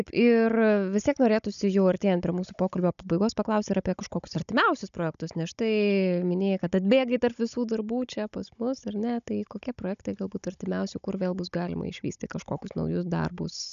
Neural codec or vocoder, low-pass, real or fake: codec, 16 kHz, 16 kbps, FunCodec, trained on Chinese and English, 50 frames a second; 7.2 kHz; fake